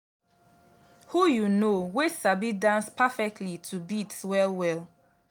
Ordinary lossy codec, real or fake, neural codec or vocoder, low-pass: none; real; none; none